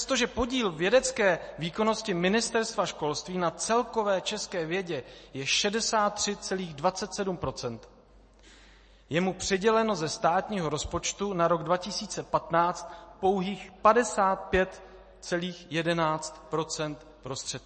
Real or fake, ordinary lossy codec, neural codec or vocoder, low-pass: real; MP3, 32 kbps; none; 9.9 kHz